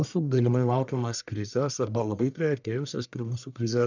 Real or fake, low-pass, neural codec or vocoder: fake; 7.2 kHz; codec, 44.1 kHz, 1.7 kbps, Pupu-Codec